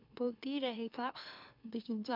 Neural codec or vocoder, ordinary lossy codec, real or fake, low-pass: autoencoder, 44.1 kHz, a latent of 192 numbers a frame, MeloTTS; none; fake; 5.4 kHz